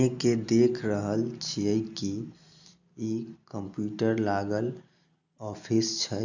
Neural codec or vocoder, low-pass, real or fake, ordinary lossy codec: none; 7.2 kHz; real; none